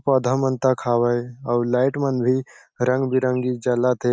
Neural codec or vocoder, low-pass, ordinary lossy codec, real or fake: none; none; none; real